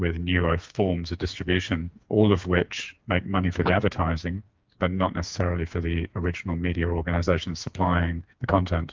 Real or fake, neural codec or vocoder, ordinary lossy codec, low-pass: fake; codec, 16 kHz, 4 kbps, FreqCodec, smaller model; Opus, 24 kbps; 7.2 kHz